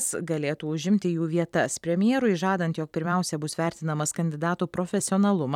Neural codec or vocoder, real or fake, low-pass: vocoder, 44.1 kHz, 128 mel bands every 256 samples, BigVGAN v2; fake; 19.8 kHz